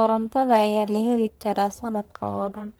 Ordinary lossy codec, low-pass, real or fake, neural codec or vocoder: none; none; fake; codec, 44.1 kHz, 1.7 kbps, Pupu-Codec